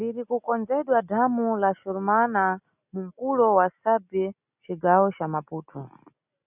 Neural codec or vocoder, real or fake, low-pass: none; real; 3.6 kHz